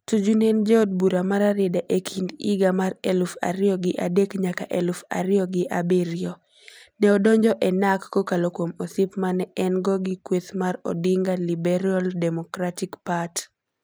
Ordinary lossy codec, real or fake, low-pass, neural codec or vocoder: none; real; none; none